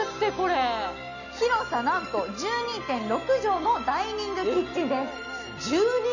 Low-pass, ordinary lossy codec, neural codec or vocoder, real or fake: 7.2 kHz; none; none; real